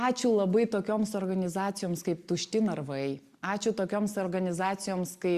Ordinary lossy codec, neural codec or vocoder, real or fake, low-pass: Opus, 64 kbps; none; real; 14.4 kHz